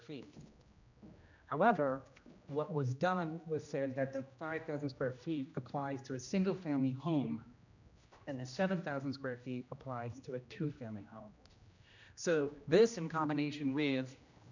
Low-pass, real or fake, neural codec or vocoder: 7.2 kHz; fake; codec, 16 kHz, 1 kbps, X-Codec, HuBERT features, trained on general audio